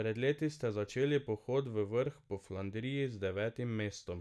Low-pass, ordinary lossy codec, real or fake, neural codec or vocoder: none; none; real; none